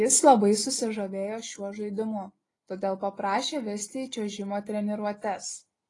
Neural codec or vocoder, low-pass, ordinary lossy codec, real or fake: none; 10.8 kHz; AAC, 32 kbps; real